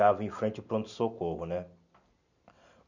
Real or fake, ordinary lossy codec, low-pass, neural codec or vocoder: real; none; 7.2 kHz; none